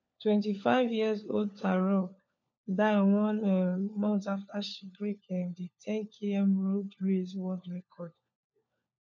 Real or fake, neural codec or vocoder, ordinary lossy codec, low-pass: fake; codec, 16 kHz, 4 kbps, FunCodec, trained on LibriTTS, 50 frames a second; none; 7.2 kHz